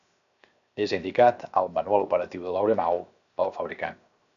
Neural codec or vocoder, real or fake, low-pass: codec, 16 kHz, 0.7 kbps, FocalCodec; fake; 7.2 kHz